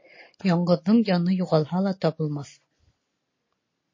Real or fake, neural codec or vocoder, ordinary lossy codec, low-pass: real; none; MP3, 32 kbps; 7.2 kHz